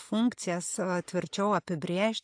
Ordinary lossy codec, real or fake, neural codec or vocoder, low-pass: AAC, 64 kbps; real; none; 9.9 kHz